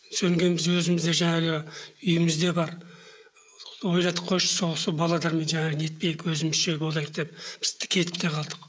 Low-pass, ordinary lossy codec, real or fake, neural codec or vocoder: none; none; fake; codec, 16 kHz, 16 kbps, FreqCodec, smaller model